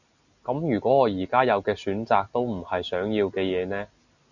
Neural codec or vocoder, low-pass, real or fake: none; 7.2 kHz; real